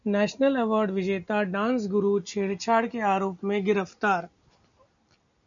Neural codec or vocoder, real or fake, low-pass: none; real; 7.2 kHz